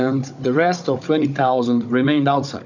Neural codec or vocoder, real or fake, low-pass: codec, 16 kHz, 16 kbps, FunCodec, trained on Chinese and English, 50 frames a second; fake; 7.2 kHz